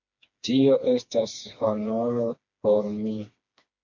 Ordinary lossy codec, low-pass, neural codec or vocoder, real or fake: MP3, 48 kbps; 7.2 kHz; codec, 16 kHz, 2 kbps, FreqCodec, smaller model; fake